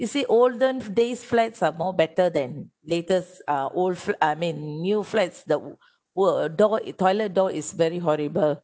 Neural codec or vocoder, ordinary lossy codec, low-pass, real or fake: none; none; none; real